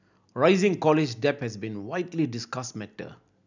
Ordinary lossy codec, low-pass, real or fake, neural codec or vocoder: none; 7.2 kHz; real; none